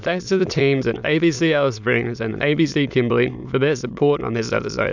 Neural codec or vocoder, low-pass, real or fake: autoencoder, 22.05 kHz, a latent of 192 numbers a frame, VITS, trained on many speakers; 7.2 kHz; fake